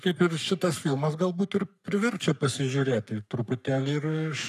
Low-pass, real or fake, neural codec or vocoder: 14.4 kHz; fake; codec, 44.1 kHz, 3.4 kbps, Pupu-Codec